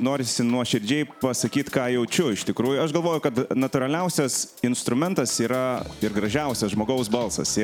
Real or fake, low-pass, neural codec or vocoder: real; 19.8 kHz; none